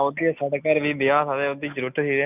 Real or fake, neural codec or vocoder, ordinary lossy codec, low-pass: real; none; none; 3.6 kHz